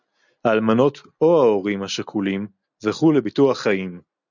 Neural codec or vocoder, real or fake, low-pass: none; real; 7.2 kHz